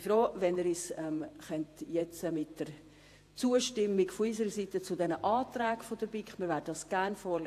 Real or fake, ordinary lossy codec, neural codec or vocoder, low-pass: fake; AAC, 64 kbps; vocoder, 48 kHz, 128 mel bands, Vocos; 14.4 kHz